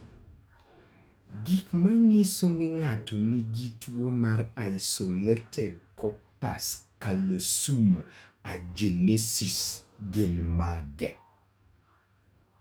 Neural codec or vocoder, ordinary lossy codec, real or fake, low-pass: codec, 44.1 kHz, 2.6 kbps, DAC; none; fake; none